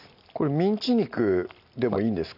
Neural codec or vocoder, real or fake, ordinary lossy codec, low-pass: none; real; none; 5.4 kHz